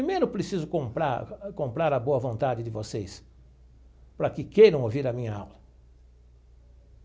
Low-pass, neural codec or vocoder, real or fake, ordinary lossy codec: none; none; real; none